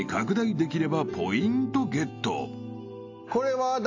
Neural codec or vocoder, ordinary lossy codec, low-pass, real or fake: none; AAC, 48 kbps; 7.2 kHz; real